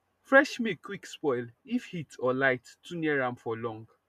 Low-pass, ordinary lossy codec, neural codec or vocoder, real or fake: 14.4 kHz; none; none; real